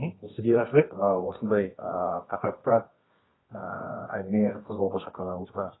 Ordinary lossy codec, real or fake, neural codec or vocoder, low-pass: AAC, 16 kbps; fake; codec, 24 kHz, 0.9 kbps, WavTokenizer, medium music audio release; 7.2 kHz